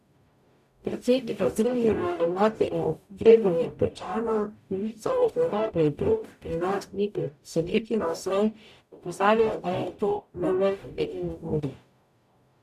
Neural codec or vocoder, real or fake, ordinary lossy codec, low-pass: codec, 44.1 kHz, 0.9 kbps, DAC; fake; none; 14.4 kHz